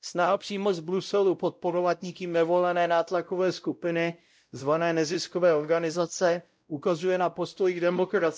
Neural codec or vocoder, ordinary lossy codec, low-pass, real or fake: codec, 16 kHz, 0.5 kbps, X-Codec, WavLM features, trained on Multilingual LibriSpeech; none; none; fake